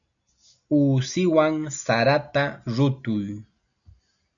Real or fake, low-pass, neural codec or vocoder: real; 7.2 kHz; none